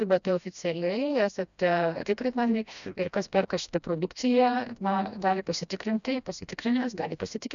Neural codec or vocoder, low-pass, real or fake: codec, 16 kHz, 1 kbps, FreqCodec, smaller model; 7.2 kHz; fake